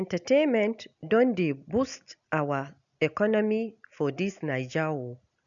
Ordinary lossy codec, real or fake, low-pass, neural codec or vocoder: none; real; 7.2 kHz; none